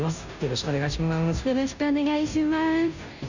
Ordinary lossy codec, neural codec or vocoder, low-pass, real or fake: none; codec, 16 kHz, 0.5 kbps, FunCodec, trained on Chinese and English, 25 frames a second; 7.2 kHz; fake